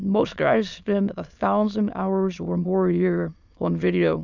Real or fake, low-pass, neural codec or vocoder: fake; 7.2 kHz; autoencoder, 22.05 kHz, a latent of 192 numbers a frame, VITS, trained on many speakers